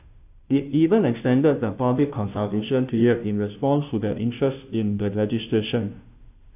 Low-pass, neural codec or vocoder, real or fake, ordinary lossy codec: 3.6 kHz; codec, 16 kHz, 0.5 kbps, FunCodec, trained on Chinese and English, 25 frames a second; fake; AAC, 32 kbps